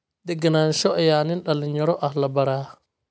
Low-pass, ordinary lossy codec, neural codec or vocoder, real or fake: none; none; none; real